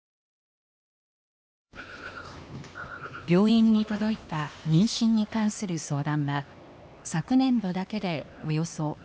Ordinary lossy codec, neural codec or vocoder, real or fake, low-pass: none; codec, 16 kHz, 2 kbps, X-Codec, HuBERT features, trained on LibriSpeech; fake; none